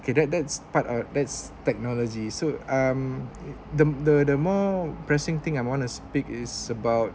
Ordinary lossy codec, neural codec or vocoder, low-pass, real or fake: none; none; none; real